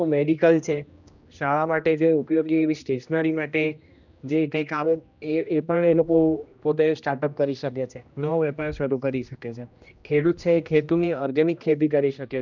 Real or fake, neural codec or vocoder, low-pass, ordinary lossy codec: fake; codec, 16 kHz, 1 kbps, X-Codec, HuBERT features, trained on general audio; 7.2 kHz; none